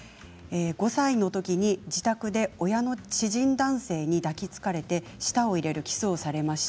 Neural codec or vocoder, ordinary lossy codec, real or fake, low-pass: none; none; real; none